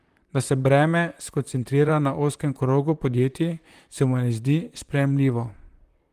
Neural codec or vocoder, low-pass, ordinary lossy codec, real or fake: vocoder, 44.1 kHz, 128 mel bands, Pupu-Vocoder; 14.4 kHz; Opus, 24 kbps; fake